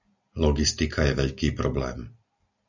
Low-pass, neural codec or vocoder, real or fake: 7.2 kHz; none; real